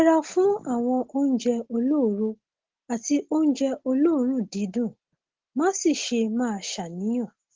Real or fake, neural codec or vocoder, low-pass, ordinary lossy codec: real; none; 7.2 kHz; Opus, 16 kbps